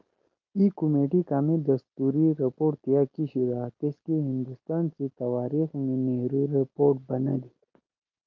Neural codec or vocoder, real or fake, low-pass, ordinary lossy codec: none; real; 7.2 kHz; Opus, 24 kbps